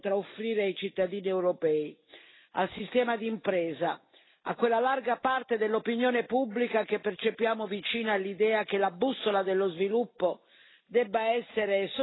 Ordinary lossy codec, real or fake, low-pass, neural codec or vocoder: AAC, 16 kbps; real; 7.2 kHz; none